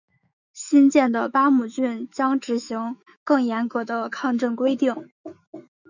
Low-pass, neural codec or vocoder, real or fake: 7.2 kHz; autoencoder, 48 kHz, 128 numbers a frame, DAC-VAE, trained on Japanese speech; fake